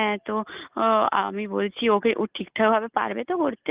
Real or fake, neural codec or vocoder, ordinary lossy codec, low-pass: real; none; Opus, 32 kbps; 3.6 kHz